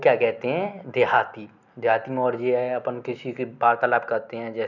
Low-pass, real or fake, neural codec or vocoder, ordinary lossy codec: 7.2 kHz; real; none; none